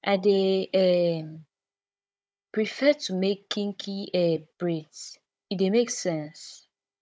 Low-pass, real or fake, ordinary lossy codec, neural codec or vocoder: none; fake; none; codec, 16 kHz, 16 kbps, FunCodec, trained on Chinese and English, 50 frames a second